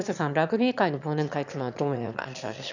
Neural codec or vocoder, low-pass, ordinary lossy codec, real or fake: autoencoder, 22.05 kHz, a latent of 192 numbers a frame, VITS, trained on one speaker; 7.2 kHz; none; fake